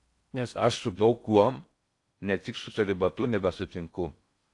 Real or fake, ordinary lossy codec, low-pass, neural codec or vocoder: fake; AAC, 48 kbps; 10.8 kHz; codec, 16 kHz in and 24 kHz out, 0.6 kbps, FocalCodec, streaming, 4096 codes